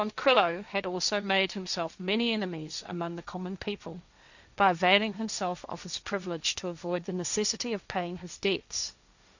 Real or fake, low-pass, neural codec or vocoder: fake; 7.2 kHz; codec, 16 kHz, 1.1 kbps, Voila-Tokenizer